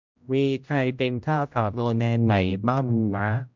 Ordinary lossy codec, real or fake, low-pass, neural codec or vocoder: MP3, 64 kbps; fake; 7.2 kHz; codec, 16 kHz, 0.5 kbps, X-Codec, HuBERT features, trained on general audio